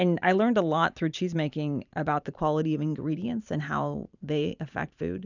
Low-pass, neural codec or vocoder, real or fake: 7.2 kHz; vocoder, 44.1 kHz, 128 mel bands every 512 samples, BigVGAN v2; fake